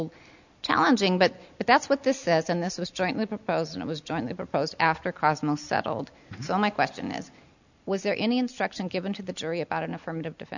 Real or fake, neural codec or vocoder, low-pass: real; none; 7.2 kHz